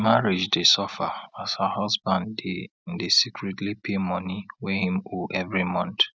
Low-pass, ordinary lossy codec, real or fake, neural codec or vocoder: none; none; real; none